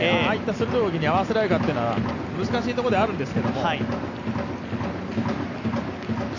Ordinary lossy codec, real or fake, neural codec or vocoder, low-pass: none; real; none; 7.2 kHz